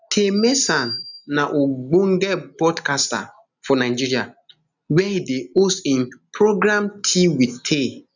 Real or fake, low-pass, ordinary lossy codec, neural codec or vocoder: real; 7.2 kHz; none; none